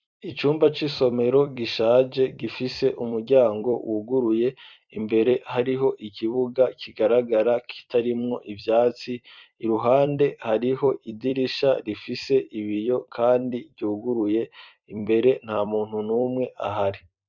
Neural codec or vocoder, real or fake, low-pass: vocoder, 44.1 kHz, 128 mel bands every 256 samples, BigVGAN v2; fake; 7.2 kHz